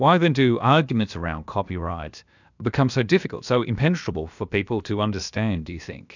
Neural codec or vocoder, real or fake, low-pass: codec, 16 kHz, about 1 kbps, DyCAST, with the encoder's durations; fake; 7.2 kHz